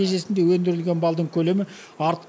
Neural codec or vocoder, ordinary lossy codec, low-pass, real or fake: none; none; none; real